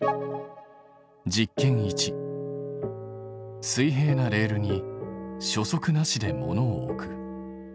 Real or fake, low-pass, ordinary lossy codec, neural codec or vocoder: real; none; none; none